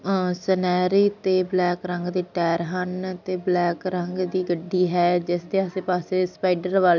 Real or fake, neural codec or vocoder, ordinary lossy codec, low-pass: real; none; none; 7.2 kHz